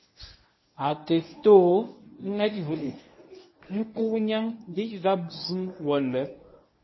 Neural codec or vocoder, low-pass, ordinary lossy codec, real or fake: codec, 24 kHz, 0.9 kbps, WavTokenizer, medium speech release version 1; 7.2 kHz; MP3, 24 kbps; fake